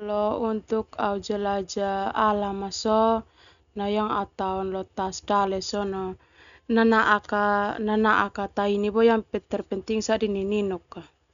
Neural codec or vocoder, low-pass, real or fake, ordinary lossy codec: none; 7.2 kHz; real; none